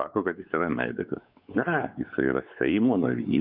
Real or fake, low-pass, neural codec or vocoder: fake; 5.4 kHz; codec, 16 kHz, 4 kbps, X-Codec, WavLM features, trained on Multilingual LibriSpeech